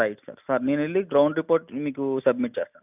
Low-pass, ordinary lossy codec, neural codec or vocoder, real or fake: 3.6 kHz; none; none; real